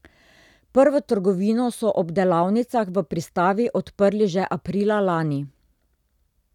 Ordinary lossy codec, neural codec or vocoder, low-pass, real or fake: none; none; 19.8 kHz; real